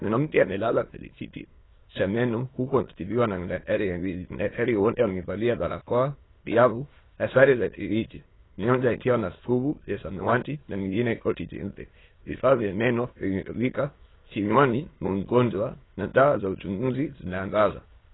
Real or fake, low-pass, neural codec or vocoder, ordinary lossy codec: fake; 7.2 kHz; autoencoder, 22.05 kHz, a latent of 192 numbers a frame, VITS, trained on many speakers; AAC, 16 kbps